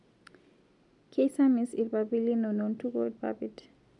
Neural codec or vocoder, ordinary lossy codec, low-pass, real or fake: none; MP3, 96 kbps; 10.8 kHz; real